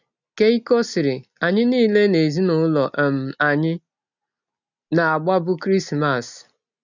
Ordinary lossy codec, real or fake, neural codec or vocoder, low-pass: none; real; none; 7.2 kHz